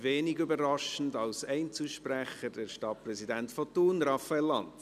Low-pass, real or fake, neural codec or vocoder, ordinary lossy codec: 14.4 kHz; real; none; none